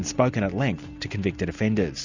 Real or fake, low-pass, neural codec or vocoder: real; 7.2 kHz; none